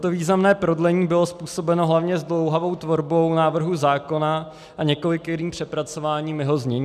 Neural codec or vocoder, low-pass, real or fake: none; 14.4 kHz; real